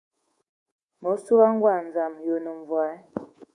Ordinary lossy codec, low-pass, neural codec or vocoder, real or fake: Opus, 64 kbps; 10.8 kHz; codec, 24 kHz, 3.1 kbps, DualCodec; fake